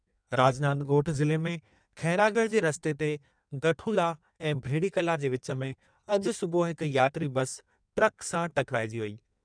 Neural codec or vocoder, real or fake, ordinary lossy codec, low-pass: codec, 16 kHz in and 24 kHz out, 1.1 kbps, FireRedTTS-2 codec; fake; none; 9.9 kHz